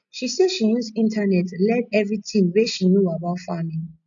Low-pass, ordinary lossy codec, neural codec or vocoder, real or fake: 7.2 kHz; none; none; real